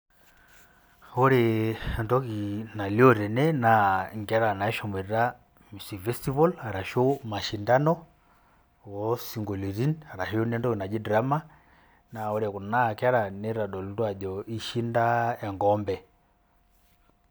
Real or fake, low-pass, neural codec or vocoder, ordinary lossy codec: real; none; none; none